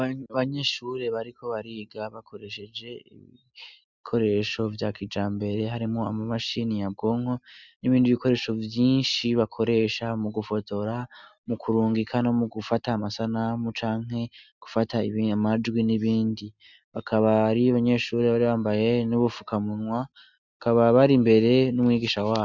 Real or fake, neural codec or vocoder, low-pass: real; none; 7.2 kHz